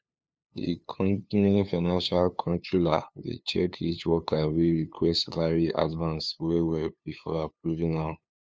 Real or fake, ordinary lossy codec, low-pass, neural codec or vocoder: fake; none; none; codec, 16 kHz, 2 kbps, FunCodec, trained on LibriTTS, 25 frames a second